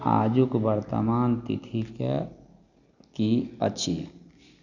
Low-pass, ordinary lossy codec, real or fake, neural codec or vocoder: 7.2 kHz; none; real; none